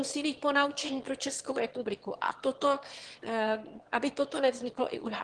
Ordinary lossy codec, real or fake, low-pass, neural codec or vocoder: Opus, 16 kbps; fake; 9.9 kHz; autoencoder, 22.05 kHz, a latent of 192 numbers a frame, VITS, trained on one speaker